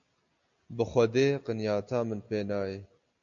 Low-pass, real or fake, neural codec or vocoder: 7.2 kHz; real; none